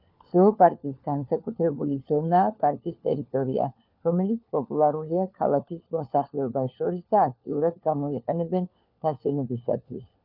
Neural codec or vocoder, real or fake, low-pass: codec, 16 kHz, 4 kbps, FunCodec, trained on LibriTTS, 50 frames a second; fake; 5.4 kHz